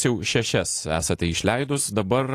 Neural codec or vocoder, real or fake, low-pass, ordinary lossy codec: none; real; 14.4 kHz; AAC, 48 kbps